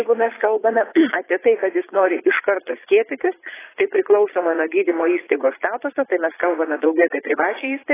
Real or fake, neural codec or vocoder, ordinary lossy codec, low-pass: fake; vocoder, 22.05 kHz, 80 mel bands, Vocos; AAC, 16 kbps; 3.6 kHz